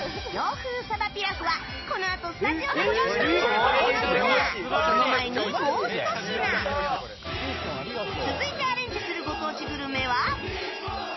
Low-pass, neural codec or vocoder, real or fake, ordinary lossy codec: 7.2 kHz; none; real; MP3, 24 kbps